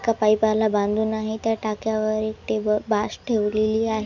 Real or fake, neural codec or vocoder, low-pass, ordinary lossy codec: real; none; 7.2 kHz; none